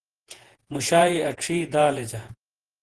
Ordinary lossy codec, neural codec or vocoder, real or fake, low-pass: Opus, 16 kbps; vocoder, 48 kHz, 128 mel bands, Vocos; fake; 10.8 kHz